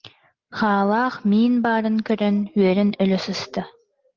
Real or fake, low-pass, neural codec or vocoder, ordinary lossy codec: real; 7.2 kHz; none; Opus, 16 kbps